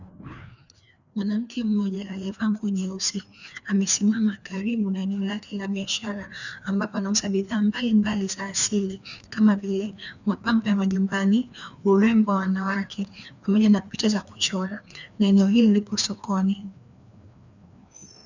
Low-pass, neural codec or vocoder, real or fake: 7.2 kHz; codec, 16 kHz, 2 kbps, FreqCodec, larger model; fake